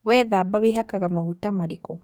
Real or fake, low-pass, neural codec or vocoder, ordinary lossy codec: fake; none; codec, 44.1 kHz, 2.6 kbps, DAC; none